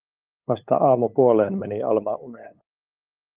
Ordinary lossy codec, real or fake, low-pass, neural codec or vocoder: Opus, 32 kbps; fake; 3.6 kHz; codec, 16 kHz, 8 kbps, FunCodec, trained on LibriTTS, 25 frames a second